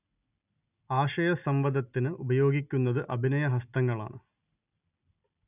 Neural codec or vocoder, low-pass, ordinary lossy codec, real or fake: none; 3.6 kHz; none; real